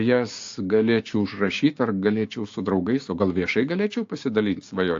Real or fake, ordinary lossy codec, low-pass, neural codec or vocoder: fake; AAC, 48 kbps; 7.2 kHz; codec, 16 kHz, 6 kbps, DAC